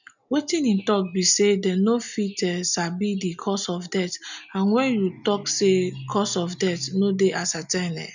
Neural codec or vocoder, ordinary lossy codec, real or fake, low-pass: none; none; real; 7.2 kHz